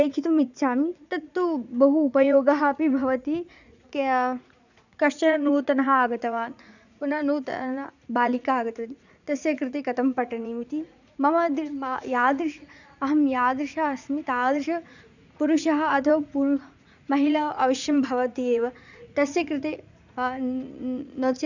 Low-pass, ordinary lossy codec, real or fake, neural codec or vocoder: 7.2 kHz; none; fake; vocoder, 44.1 kHz, 80 mel bands, Vocos